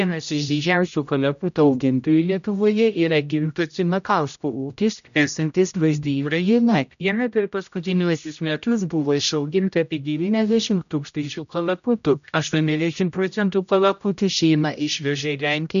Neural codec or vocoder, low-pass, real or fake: codec, 16 kHz, 0.5 kbps, X-Codec, HuBERT features, trained on general audio; 7.2 kHz; fake